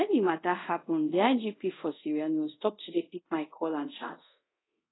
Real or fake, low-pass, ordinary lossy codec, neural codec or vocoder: fake; 7.2 kHz; AAC, 16 kbps; codec, 24 kHz, 0.5 kbps, DualCodec